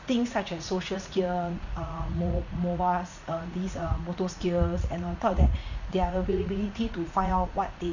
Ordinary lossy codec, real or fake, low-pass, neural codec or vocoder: none; fake; 7.2 kHz; vocoder, 44.1 kHz, 80 mel bands, Vocos